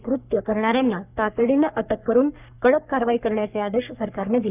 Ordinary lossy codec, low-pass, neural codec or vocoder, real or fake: none; 3.6 kHz; codec, 44.1 kHz, 3.4 kbps, Pupu-Codec; fake